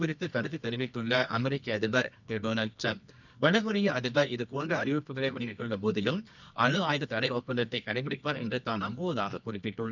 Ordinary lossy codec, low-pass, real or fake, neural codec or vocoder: none; 7.2 kHz; fake; codec, 24 kHz, 0.9 kbps, WavTokenizer, medium music audio release